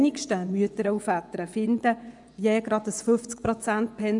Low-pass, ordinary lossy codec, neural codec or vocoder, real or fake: 10.8 kHz; none; vocoder, 24 kHz, 100 mel bands, Vocos; fake